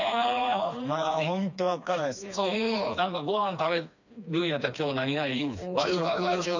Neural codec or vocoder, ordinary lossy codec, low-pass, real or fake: codec, 16 kHz, 2 kbps, FreqCodec, smaller model; none; 7.2 kHz; fake